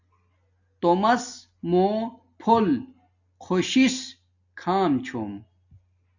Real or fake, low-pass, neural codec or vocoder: real; 7.2 kHz; none